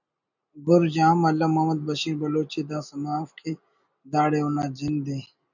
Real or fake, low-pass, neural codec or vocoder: real; 7.2 kHz; none